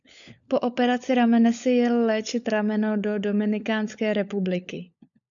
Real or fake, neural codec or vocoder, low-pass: fake; codec, 16 kHz, 16 kbps, FunCodec, trained on LibriTTS, 50 frames a second; 7.2 kHz